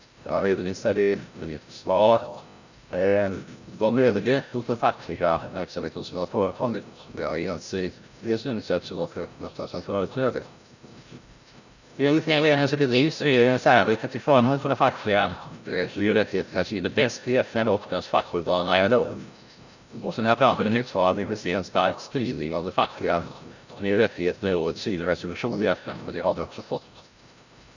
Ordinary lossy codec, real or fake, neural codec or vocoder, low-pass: none; fake; codec, 16 kHz, 0.5 kbps, FreqCodec, larger model; 7.2 kHz